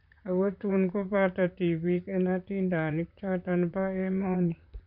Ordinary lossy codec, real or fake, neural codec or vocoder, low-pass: none; fake; vocoder, 44.1 kHz, 128 mel bands, Pupu-Vocoder; 5.4 kHz